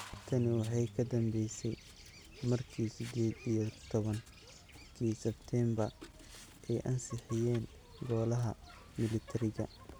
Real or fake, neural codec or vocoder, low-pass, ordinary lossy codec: real; none; none; none